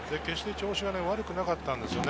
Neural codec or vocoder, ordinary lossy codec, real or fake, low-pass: none; none; real; none